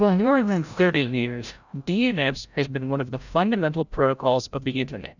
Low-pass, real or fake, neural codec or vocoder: 7.2 kHz; fake; codec, 16 kHz, 0.5 kbps, FreqCodec, larger model